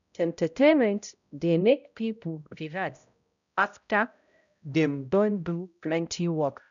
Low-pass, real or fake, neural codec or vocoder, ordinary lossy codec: 7.2 kHz; fake; codec, 16 kHz, 0.5 kbps, X-Codec, HuBERT features, trained on balanced general audio; none